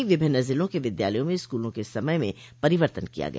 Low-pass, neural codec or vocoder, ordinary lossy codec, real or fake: none; none; none; real